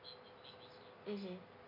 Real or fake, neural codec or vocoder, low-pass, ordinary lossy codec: real; none; 5.4 kHz; none